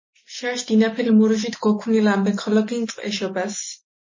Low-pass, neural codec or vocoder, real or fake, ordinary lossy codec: 7.2 kHz; none; real; MP3, 32 kbps